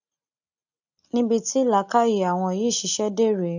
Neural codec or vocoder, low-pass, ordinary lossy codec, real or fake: none; 7.2 kHz; none; real